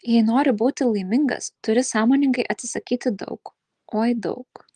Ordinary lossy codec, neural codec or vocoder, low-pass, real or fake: Opus, 24 kbps; none; 9.9 kHz; real